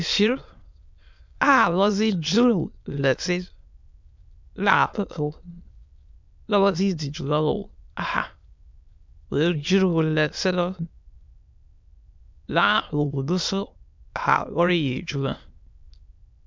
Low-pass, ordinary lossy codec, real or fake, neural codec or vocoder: 7.2 kHz; MP3, 64 kbps; fake; autoencoder, 22.05 kHz, a latent of 192 numbers a frame, VITS, trained on many speakers